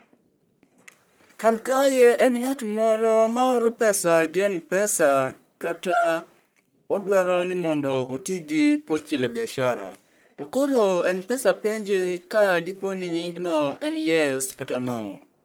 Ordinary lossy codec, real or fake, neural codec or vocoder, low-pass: none; fake; codec, 44.1 kHz, 1.7 kbps, Pupu-Codec; none